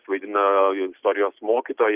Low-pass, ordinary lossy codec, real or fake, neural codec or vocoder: 3.6 kHz; Opus, 24 kbps; real; none